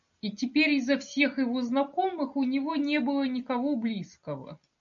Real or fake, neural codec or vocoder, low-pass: real; none; 7.2 kHz